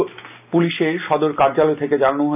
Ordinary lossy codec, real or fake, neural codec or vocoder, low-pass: none; real; none; 3.6 kHz